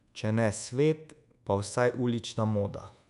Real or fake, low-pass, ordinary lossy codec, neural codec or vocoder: fake; 10.8 kHz; none; codec, 24 kHz, 1.2 kbps, DualCodec